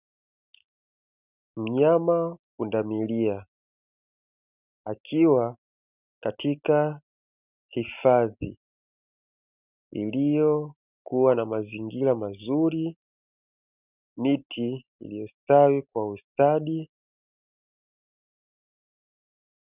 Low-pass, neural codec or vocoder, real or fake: 3.6 kHz; none; real